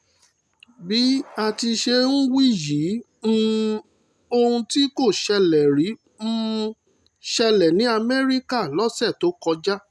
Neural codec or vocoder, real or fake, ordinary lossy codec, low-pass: none; real; none; none